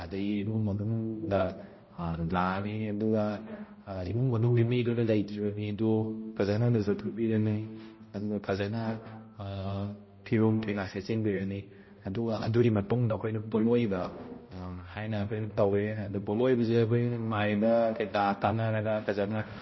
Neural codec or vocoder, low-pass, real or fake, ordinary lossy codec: codec, 16 kHz, 0.5 kbps, X-Codec, HuBERT features, trained on balanced general audio; 7.2 kHz; fake; MP3, 24 kbps